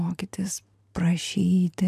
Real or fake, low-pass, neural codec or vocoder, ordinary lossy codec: fake; 14.4 kHz; vocoder, 44.1 kHz, 128 mel bands every 512 samples, BigVGAN v2; AAC, 96 kbps